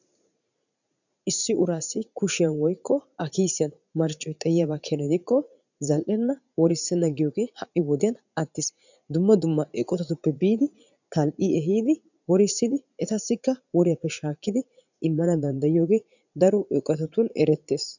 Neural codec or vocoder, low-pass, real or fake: vocoder, 44.1 kHz, 80 mel bands, Vocos; 7.2 kHz; fake